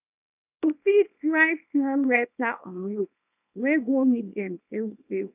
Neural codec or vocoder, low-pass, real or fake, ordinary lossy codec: codec, 24 kHz, 0.9 kbps, WavTokenizer, small release; 3.6 kHz; fake; none